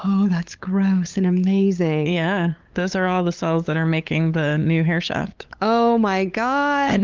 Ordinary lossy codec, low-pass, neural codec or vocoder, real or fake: Opus, 24 kbps; 7.2 kHz; codec, 16 kHz, 8 kbps, FunCodec, trained on LibriTTS, 25 frames a second; fake